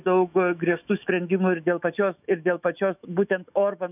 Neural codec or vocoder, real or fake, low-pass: autoencoder, 48 kHz, 128 numbers a frame, DAC-VAE, trained on Japanese speech; fake; 3.6 kHz